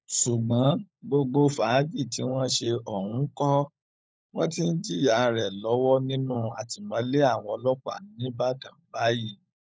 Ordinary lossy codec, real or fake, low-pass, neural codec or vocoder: none; fake; none; codec, 16 kHz, 16 kbps, FunCodec, trained on LibriTTS, 50 frames a second